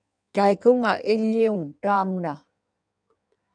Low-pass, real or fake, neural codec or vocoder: 9.9 kHz; fake; codec, 16 kHz in and 24 kHz out, 1.1 kbps, FireRedTTS-2 codec